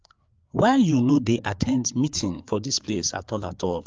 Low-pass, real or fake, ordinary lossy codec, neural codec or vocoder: 7.2 kHz; fake; Opus, 24 kbps; codec, 16 kHz, 4 kbps, FreqCodec, larger model